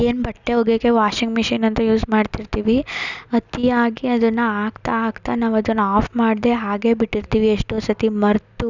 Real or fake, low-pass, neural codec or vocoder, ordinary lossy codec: real; 7.2 kHz; none; none